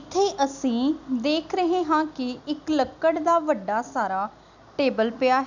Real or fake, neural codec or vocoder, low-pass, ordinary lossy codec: real; none; 7.2 kHz; none